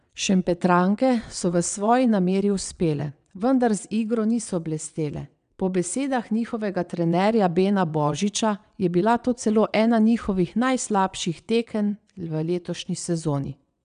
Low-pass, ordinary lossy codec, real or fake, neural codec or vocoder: 9.9 kHz; none; fake; vocoder, 22.05 kHz, 80 mel bands, WaveNeXt